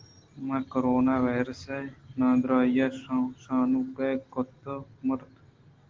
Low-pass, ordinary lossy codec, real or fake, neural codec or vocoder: 7.2 kHz; Opus, 16 kbps; real; none